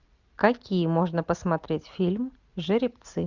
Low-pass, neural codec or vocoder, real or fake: 7.2 kHz; none; real